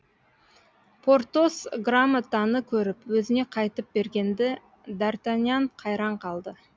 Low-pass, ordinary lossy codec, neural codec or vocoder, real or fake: none; none; none; real